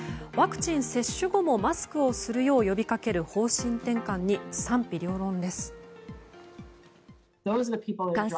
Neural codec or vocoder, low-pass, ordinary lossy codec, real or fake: none; none; none; real